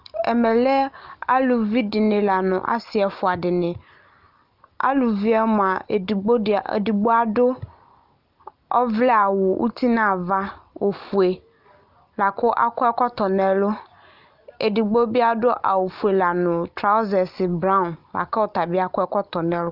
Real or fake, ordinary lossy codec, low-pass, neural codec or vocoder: real; Opus, 32 kbps; 5.4 kHz; none